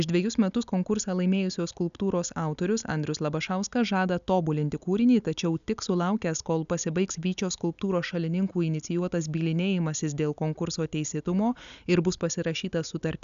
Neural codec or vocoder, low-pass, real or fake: none; 7.2 kHz; real